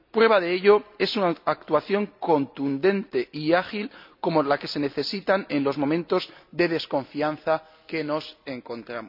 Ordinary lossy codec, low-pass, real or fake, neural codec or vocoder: none; 5.4 kHz; real; none